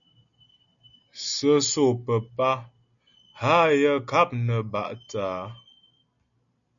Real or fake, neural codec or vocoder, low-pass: real; none; 7.2 kHz